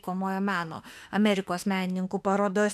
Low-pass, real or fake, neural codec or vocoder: 14.4 kHz; fake; autoencoder, 48 kHz, 32 numbers a frame, DAC-VAE, trained on Japanese speech